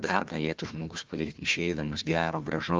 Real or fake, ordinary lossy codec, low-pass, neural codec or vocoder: fake; Opus, 24 kbps; 7.2 kHz; codec, 16 kHz, 1 kbps, FunCodec, trained on Chinese and English, 50 frames a second